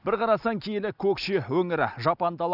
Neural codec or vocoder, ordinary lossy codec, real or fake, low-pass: none; none; real; 5.4 kHz